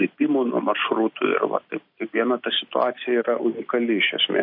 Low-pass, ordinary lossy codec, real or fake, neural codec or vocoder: 5.4 kHz; MP3, 32 kbps; real; none